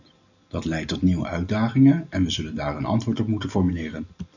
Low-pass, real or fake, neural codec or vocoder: 7.2 kHz; real; none